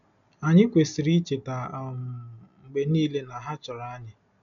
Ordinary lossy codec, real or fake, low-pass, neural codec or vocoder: none; real; 7.2 kHz; none